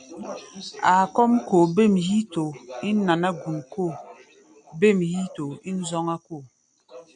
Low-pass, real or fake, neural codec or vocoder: 9.9 kHz; real; none